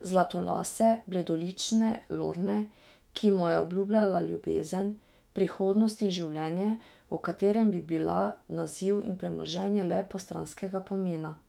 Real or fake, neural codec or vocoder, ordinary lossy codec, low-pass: fake; autoencoder, 48 kHz, 32 numbers a frame, DAC-VAE, trained on Japanese speech; MP3, 96 kbps; 19.8 kHz